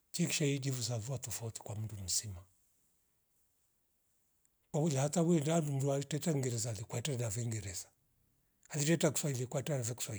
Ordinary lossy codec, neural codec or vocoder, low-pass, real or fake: none; none; none; real